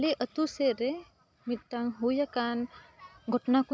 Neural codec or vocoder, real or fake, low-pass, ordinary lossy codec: none; real; none; none